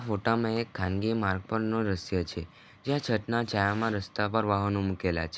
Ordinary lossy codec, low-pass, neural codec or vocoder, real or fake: none; none; none; real